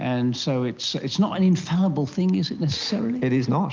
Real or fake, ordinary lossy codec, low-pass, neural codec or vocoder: real; Opus, 32 kbps; 7.2 kHz; none